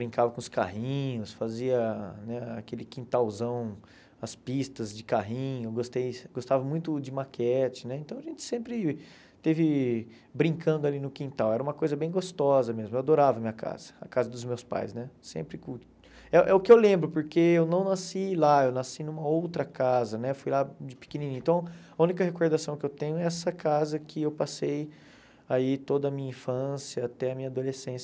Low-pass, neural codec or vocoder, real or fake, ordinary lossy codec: none; none; real; none